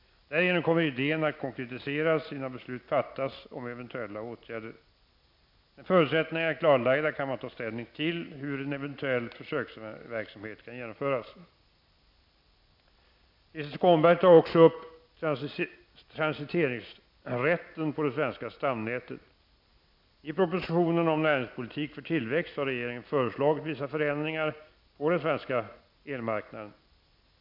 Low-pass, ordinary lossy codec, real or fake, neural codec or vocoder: 5.4 kHz; MP3, 48 kbps; real; none